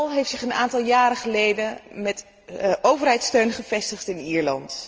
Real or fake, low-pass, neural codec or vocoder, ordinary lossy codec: real; 7.2 kHz; none; Opus, 24 kbps